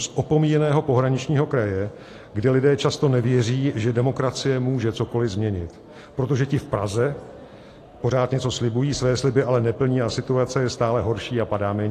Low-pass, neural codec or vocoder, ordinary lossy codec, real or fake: 14.4 kHz; none; AAC, 48 kbps; real